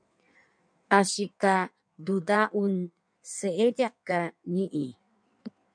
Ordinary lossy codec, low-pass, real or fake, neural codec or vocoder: MP3, 96 kbps; 9.9 kHz; fake; codec, 16 kHz in and 24 kHz out, 1.1 kbps, FireRedTTS-2 codec